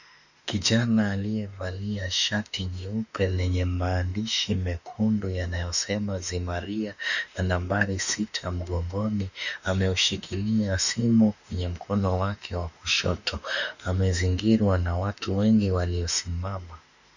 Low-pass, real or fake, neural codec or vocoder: 7.2 kHz; fake; autoencoder, 48 kHz, 32 numbers a frame, DAC-VAE, trained on Japanese speech